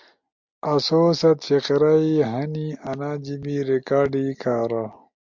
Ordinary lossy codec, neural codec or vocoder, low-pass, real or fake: MP3, 64 kbps; none; 7.2 kHz; real